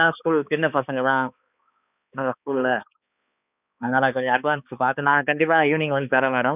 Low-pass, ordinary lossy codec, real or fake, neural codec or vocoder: 3.6 kHz; none; fake; codec, 16 kHz, 2 kbps, X-Codec, HuBERT features, trained on balanced general audio